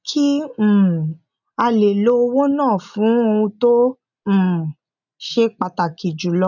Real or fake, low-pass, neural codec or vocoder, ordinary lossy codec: real; 7.2 kHz; none; none